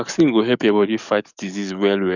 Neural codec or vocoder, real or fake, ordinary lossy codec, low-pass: codec, 44.1 kHz, 7.8 kbps, DAC; fake; none; 7.2 kHz